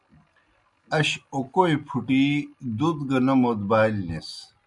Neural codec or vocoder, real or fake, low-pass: none; real; 10.8 kHz